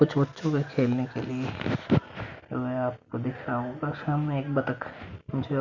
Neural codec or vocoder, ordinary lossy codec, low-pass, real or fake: none; none; 7.2 kHz; real